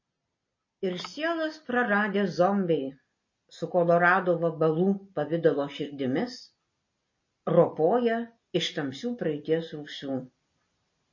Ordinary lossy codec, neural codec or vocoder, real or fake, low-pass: MP3, 32 kbps; none; real; 7.2 kHz